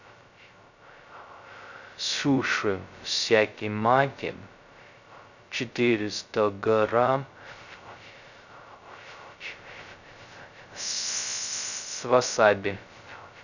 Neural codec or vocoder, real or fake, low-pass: codec, 16 kHz, 0.2 kbps, FocalCodec; fake; 7.2 kHz